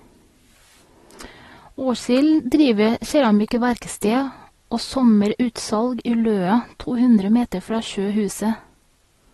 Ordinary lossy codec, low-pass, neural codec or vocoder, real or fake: AAC, 32 kbps; 19.8 kHz; none; real